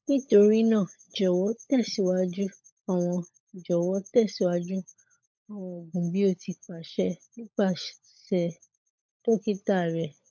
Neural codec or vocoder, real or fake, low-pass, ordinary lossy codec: codec, 16 kHz, 16 kbps, FunCodec, trained on LibriTTS, 50 frames a second; fake; 7.2 kHz; MP3, 64 kbps